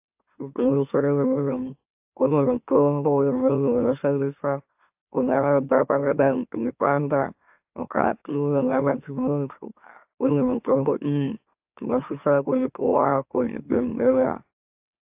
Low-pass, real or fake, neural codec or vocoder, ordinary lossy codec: 3.6 kHz; fake; autoencoder, 44.1 kHz, a latent of 192 numbers a frame, MeloTTS; AAC, 32 kbps